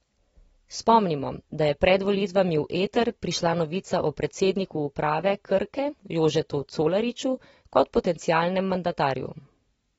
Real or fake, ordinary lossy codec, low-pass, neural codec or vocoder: real; AAC, 24 kbps; 19.8 kHz; none